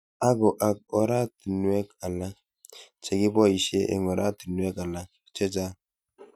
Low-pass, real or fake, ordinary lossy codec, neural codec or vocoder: 19.8 kHz; real; none; none